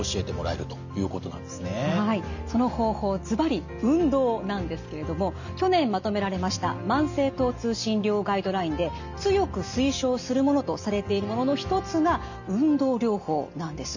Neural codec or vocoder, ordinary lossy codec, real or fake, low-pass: none; none; real; 7.2 kHz